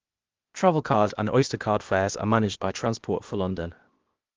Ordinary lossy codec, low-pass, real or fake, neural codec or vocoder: Opus, 32 kbps; 7.2 kHz; fake; codec, 16 kHz, 0.8 kbps, ZipCodec